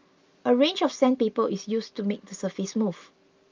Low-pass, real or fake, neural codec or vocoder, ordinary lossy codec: 7.2 kHz; real; none; Opus, 32 kbps